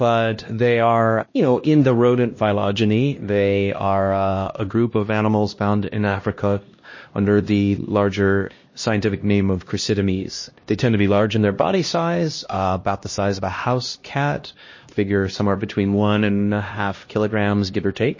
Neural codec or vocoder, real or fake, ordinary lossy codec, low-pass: codec, 16 kHz, 1 kbps, X-Codec, HuBERT features, trained on LibriSpeech; fake; MP3, 32 kbps; 7.2 kHz